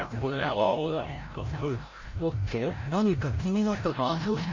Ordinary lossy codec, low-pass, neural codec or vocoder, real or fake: MP3, 32 kbps; 7.2 kHz; codec, 16 kHz, 0.5 kbps, FreqCodec, larger model; fake